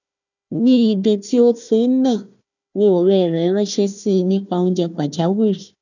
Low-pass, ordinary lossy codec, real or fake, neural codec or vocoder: 7.2 kHz; none; fake; codec, 16 kHz, 1 kbps, FunCodec, trained on Chinese and English, 50 frames a second